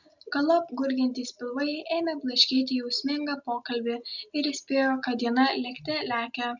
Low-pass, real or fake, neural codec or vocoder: 7.2 kHz; real; none